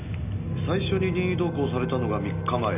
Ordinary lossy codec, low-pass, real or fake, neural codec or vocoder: none; 3.6 kHz; real; none